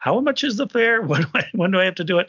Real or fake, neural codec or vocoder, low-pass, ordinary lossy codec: real; none; 7.2 kHz; MP3, 64 kbps